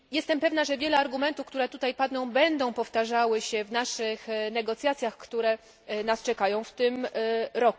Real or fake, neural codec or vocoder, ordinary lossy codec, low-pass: real; none; none; none